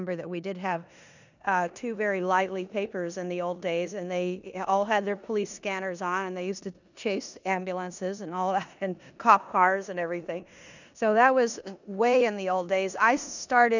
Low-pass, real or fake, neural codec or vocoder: 7.2 kHz; fake; codec, 16 kHz in and 24 kHz out, 0.9 kbps, LongCat-Audio-Codec, four codebook decoder